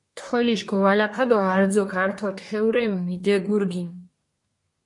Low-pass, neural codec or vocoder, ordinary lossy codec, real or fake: 10.8 kHz; codec, 24 kHz, 1 kbps, SNAC; MP3, 48 kbps; fake